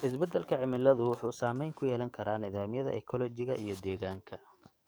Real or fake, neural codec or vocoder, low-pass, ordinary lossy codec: fake; codec, 44.1 kHz, 7.8 kbps, DAC; none; none